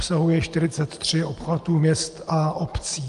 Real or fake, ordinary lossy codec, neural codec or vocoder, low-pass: real; Opus, 32 kbps; none; 10.8 kHz